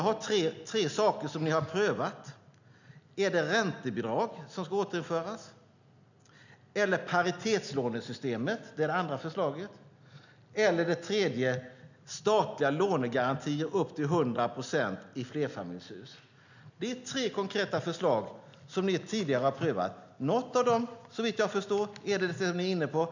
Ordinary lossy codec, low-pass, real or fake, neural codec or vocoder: none; 7.2 kHz; real; none